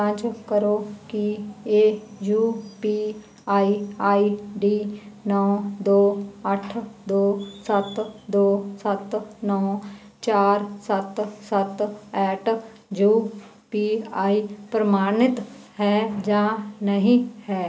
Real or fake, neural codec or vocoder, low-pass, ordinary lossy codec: real; none; none; none